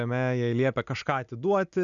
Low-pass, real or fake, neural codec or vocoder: 7.2 kHz; real; none